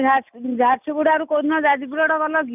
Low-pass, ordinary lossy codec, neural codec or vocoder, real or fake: 3.6 kHz; none; none; real